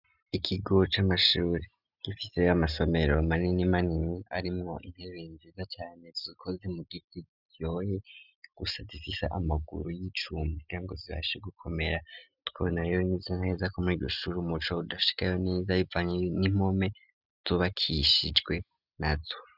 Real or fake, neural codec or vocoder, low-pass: real; none; 5.4 kHz